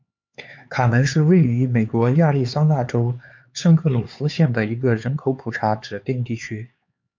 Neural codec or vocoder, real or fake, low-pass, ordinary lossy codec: codec, 16 kHz, 4 kbps, X-Codec, HuBERT features, trained on LibriSpeech; fake; 7.2 kHz; MP3, 64 kbps